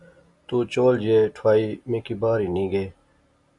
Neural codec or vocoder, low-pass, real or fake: none; 10.8 kHz; real